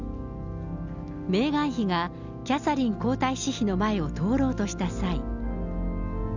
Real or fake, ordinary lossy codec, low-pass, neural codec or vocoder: real; none; 7.2 kHz; none